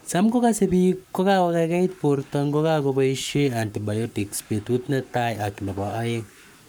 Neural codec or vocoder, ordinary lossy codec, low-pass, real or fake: codec, 44.1 kHz, 7.8 kbps, Pupu-Codec; none; none; fake